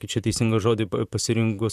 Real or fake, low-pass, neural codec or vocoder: fake; 14.4 kHz; vocoder, 44.1 kHz, 128 mel bands, Pupu-Vocoder